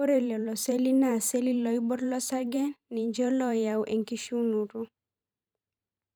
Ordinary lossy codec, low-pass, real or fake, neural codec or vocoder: none; none; fake; vocoder, 44.1 kHz, 128 mel bands every 512 samples, BigVGAN v2